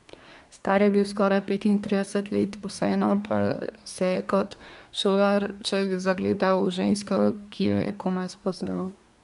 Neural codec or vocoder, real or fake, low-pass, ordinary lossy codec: codec, 24 kHz, 1 kbps, SNAC; fake; 10.8 kHz; none